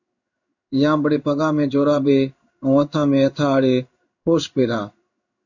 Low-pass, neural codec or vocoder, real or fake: 7.2 kHz; codec, 16 kHz in and 24 kHz out, 1 kbps, XY-Tokenizer; fake